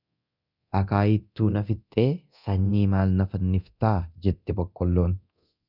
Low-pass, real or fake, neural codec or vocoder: 5.4 kHz; fake; codec, 24 kHz, 0.9 kbps, DualCodec